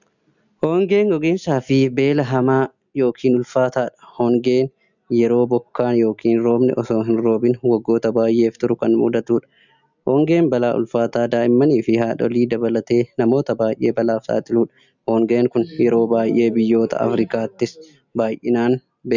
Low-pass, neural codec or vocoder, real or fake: 7.2 kHz; none; real